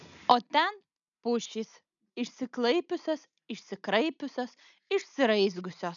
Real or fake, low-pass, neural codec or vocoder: real; 7.2 kHz; none